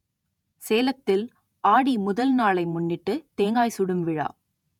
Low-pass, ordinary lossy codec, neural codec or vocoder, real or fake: 19.8 kHz; none; vocoder, 48 kHz, 128 mel bands, Vocos; fake